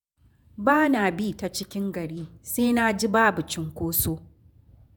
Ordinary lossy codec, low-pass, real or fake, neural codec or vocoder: none; none; fake; vocoder, 48 kHz, 128 mel bands, Vocos